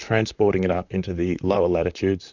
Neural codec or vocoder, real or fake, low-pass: vocoder, 44.1 kHz, 128 mel bands, Pupu-Vocoder; fake; 7.2 kHz